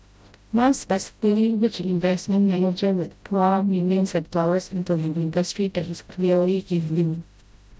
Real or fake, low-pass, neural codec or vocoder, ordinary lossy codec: fake; none; codec, 16 kHz, 0.5 kbps, FreqCodec, smaller model; none